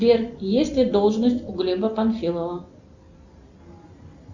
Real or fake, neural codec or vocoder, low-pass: real; none; 7.2 kHz